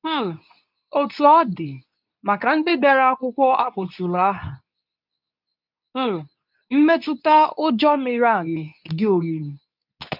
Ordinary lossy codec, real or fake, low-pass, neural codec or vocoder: none; fake; 5.4 kHz; codec, 24 kHz, 0.9 kbps, WavTokenizer, medium speech release version 2